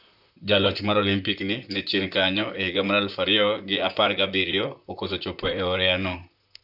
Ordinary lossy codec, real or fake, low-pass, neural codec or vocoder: none; fake; 5.4 kHz; vocoder, 44.1 kHz, 128 mel bands, Pupu-Vocoder